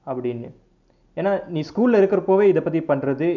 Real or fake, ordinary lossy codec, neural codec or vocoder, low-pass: real; none; none; 7.2 kHz